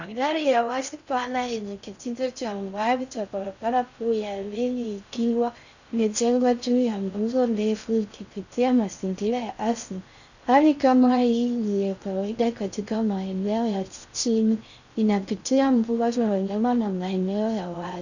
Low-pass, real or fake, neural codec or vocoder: 7.2 kHz; fake; codec, 16 kHz in and 24 kHz out, 0.6 kbps, FocalCodec, streaming, 4096 codes